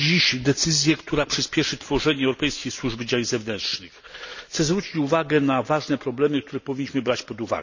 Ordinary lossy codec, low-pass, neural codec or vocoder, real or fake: none; 7.2 kHz; none; real